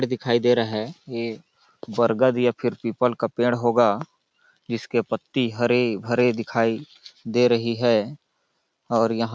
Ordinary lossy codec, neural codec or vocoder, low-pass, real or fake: none; none; none; real